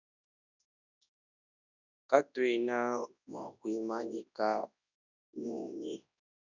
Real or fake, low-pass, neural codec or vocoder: fake; 7.2 kHz; codec, 24 kHz, 0.9 kbps, WavTokenizer, large speech release